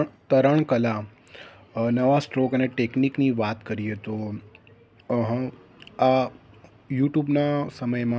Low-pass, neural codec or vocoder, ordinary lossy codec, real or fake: none; none; none; real